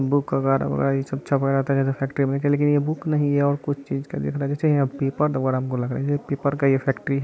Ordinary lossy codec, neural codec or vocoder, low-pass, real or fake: none; none; none; real